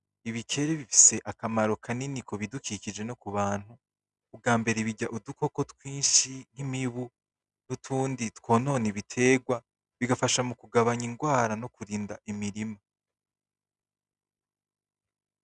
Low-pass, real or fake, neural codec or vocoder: 10.8 kHz; real; none